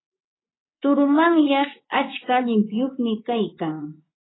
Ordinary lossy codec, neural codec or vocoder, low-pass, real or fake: AAC, 16 kbps; vocoder, 24 kHz, 100 mel bands, Vocos; 7.2 kHz; fake